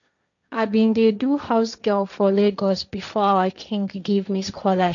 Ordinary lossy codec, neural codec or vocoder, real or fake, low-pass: none; codec, 16 kHz, 1.1 kbps, Voila-Tokenizer; fake; 7.2 kHz